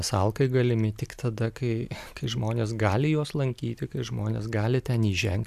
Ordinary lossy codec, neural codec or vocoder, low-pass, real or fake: AAC, 96 kbps; none; 14.4 kHz; real